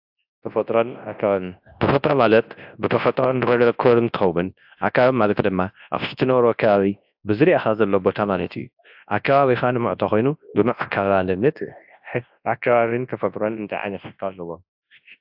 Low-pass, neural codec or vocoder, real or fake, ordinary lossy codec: 5.4 kHz; codec, 24 kHz, 0.9 kbps, WavTokenizer, large speech release; fake; AAC, 48 kbps